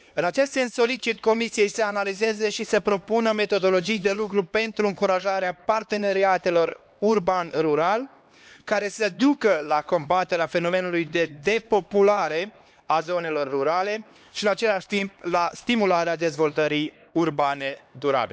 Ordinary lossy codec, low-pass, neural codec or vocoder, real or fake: none; none; codec, 16 kHz, 2 kbps, X-Codec, HuBERT features, trained on LibriSpeech; fake